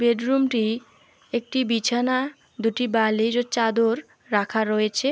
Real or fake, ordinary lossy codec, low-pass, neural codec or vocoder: real; none; none; none